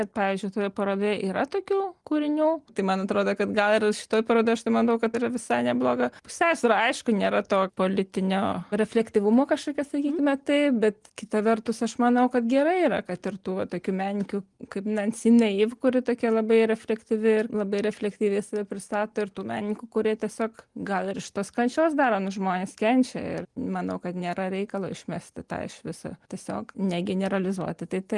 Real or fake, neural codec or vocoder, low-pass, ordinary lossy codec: real; none; 10.8 kHz; Opus, 24 kbps